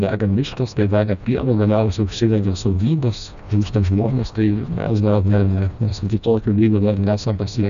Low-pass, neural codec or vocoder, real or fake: 7.2 kHz; codec, 16 kHz, 1 kbps, FreqCodec, smaller model; fake